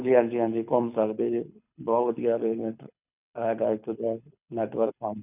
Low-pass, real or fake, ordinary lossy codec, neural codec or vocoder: 3.6 kHz; fake; none; codec, 24 kHz, 6 kbps, HILCodec